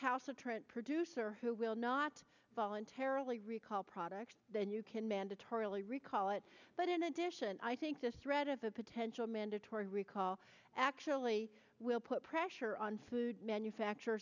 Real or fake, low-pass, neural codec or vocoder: real; 7.2 kHz; none